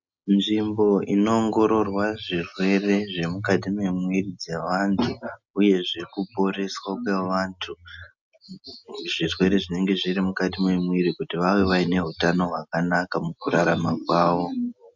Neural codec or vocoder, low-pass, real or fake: none; 7.2 kHz; real